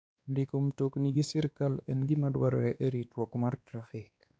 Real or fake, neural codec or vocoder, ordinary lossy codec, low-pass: fake; codec, 16 kHz, 2 kbps, X-Codec, WavLM features, trained on Multilingual LibriSpeech; none; none